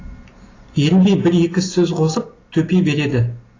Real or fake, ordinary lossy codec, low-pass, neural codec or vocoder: real; AAC, 32 kbps; 7.2 kHz; none